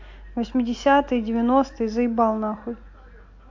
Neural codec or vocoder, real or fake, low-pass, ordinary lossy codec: none; real; 7.2 kHz; AAC, 48 kbps